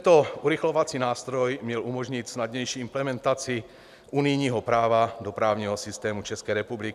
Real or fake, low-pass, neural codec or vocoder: real; 14.4 kHz; none